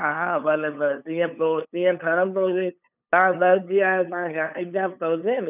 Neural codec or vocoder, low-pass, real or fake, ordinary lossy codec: codec, 16 kHz, 8 kbps, FunCodec, trained on LibriTTS, 25 frames a second; 3.6 kHz; fake; none